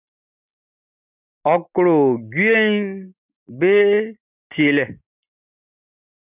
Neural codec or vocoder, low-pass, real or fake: none; 3.6 kHz; real